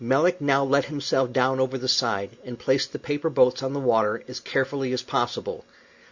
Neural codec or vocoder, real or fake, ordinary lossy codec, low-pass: none; real; Opus, 64 kbps; 7.2 kHz